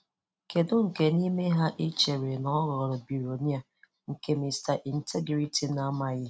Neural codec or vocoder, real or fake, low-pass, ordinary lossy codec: none; real; none; none